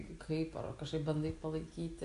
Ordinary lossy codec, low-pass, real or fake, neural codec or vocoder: AAC, 48 kbps; 10.8 kHz; real; none